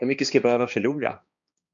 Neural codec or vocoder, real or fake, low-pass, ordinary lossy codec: codec, 16 kHz, 2 kbps, X-Codec, HuBERT features, trained on balanced general audio; fake; 7.2 kHz; MP3, 64 kbps